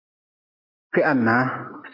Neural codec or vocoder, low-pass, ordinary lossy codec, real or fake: none; 5.4 kHz; MP3, 32 kbps; real